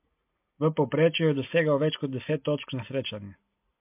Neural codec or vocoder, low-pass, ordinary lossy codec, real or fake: none; 3.6 kHz; MP3, 32 kbps; real